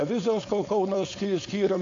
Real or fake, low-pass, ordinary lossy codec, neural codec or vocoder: fake; 7.2 kHz; AAC, 48 kbps; codec, 16 kHz, 4.8 kbps, FACodec